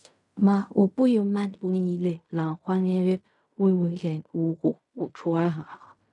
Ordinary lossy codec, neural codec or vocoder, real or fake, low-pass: none; codec, 16 kHz in and 24 kHz out, 0.4 kbps, LongCat-Audio-Codec, fine tuned four codebook decoder; fake; 10.8 kHz